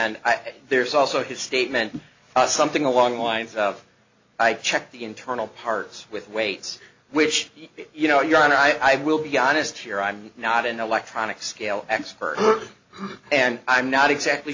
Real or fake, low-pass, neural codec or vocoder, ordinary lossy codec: real; 7.2 kHz; none; AAC, 48 kbps